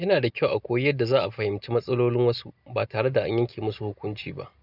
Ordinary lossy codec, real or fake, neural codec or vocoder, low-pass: none; real; none; 5.4 kHz